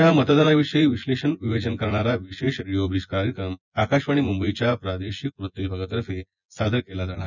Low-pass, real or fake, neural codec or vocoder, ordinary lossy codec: 7.2 kHz; fake; vocoder, 24 kHz, 100 mel bands, Vocos; none